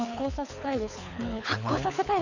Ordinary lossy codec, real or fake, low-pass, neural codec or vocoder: none; fake; 7.2 kHz; codec, 24 kHz, 6 kbps, HILCodec